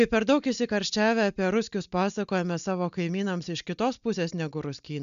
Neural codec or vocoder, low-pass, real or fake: none; 7.2 kHz; real